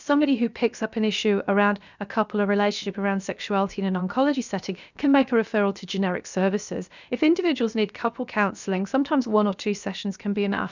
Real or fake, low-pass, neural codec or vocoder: fake; 7.2 kHz; codec, 16 kHz, about 1 kbps, DyCAST, with the encoder's durations